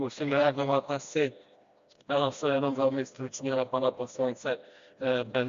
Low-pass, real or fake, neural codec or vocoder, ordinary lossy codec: 7.2 kHz; fake; codec, 16 kHz, 1 kbps, FreqCodec, smaller model; Opus, 64 kbps